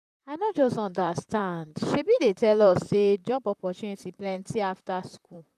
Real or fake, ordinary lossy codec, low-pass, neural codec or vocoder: fake; none; 14.4 kHz; vocoder, 44.1 kHz, 128 mel bands, Pupu-Vocoder